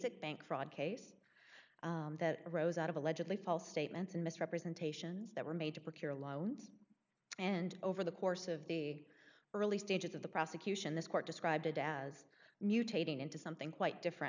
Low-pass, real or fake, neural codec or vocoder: 7.2 kHz; real; none